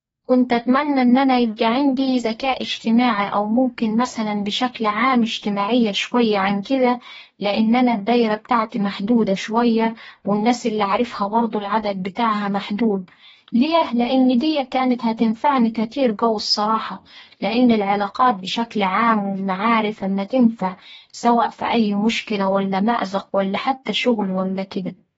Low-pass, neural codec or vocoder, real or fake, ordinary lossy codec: 19.8 kHz; codec, 44.1 kHz, 2.6 kbps, DAC; fake; AAC, 24 kbps